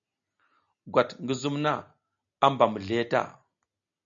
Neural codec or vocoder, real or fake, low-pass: none; real; 7.2 kHz